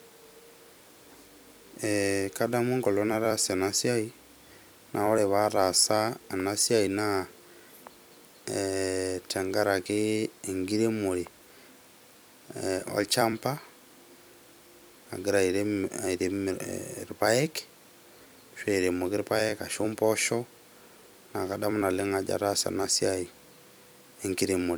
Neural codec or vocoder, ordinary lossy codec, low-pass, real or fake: vocoder, 44.1 kHz, 128 mel bands every 256 samples, BigVGAN v2; none; none; fake